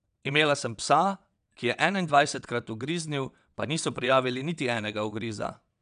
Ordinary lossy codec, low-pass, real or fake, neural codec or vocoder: none; 9.9 kHz; fake; vocoder, 22.05 kHz, 80 mel bands, WaveNeXt